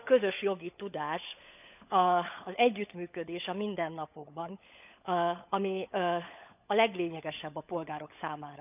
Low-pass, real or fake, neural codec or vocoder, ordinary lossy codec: 3.6 kHz; fake; codec, 16 kHz, 16 kbps, FunCodec, trained on LibriTTS, 50 frames a second; none